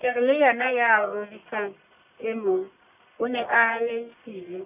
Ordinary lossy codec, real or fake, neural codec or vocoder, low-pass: none; fake; codec, 44.1 kHz, 1.7 kbps, Pupu-Codec; 3.6 kHz